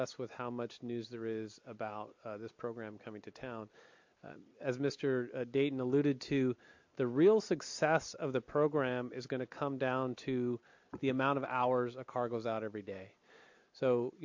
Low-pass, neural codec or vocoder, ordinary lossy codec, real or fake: 7.2 kHz; none; MP3, 48 kbps; real